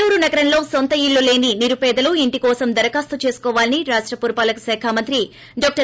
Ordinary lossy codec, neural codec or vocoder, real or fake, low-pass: none; none; real; none